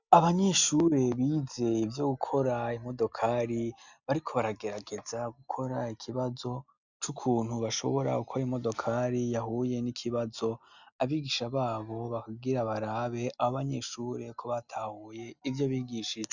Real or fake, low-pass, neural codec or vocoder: real; 7.2 kHz; none